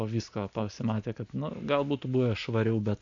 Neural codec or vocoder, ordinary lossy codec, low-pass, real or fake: none; MP3, 64 kbps; 7.2 kHz; real